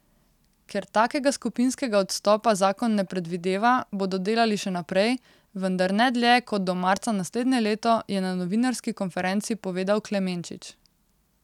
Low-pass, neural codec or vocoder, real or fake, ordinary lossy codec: 19.8 kHz; none; real; none